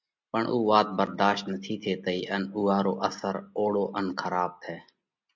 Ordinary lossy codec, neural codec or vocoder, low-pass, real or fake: MP3, 64 kbps; none; 7.2 kHz; real